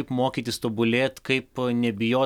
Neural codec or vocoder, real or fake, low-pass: none; real; 19.8 kHz